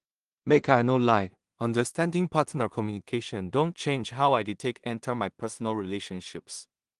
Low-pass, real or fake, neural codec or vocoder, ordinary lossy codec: 10.8 kHz; fake; codec, 16 kHz in and 24 kHz out, 0.4 kbps, LongCat-Audio-Codec, two codebook decoder; Opus, 24 kbps